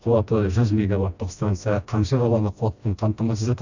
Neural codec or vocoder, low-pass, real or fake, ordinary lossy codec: codec, 16 kHz, 1 kbps, FreqCodec, smaller model; 7.2 kHz; fake; none